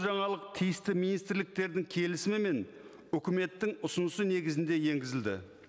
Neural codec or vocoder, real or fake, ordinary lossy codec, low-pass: none; real; none; none